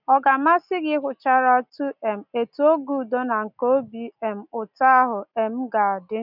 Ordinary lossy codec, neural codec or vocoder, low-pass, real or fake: none; none; 5.4 kHz; real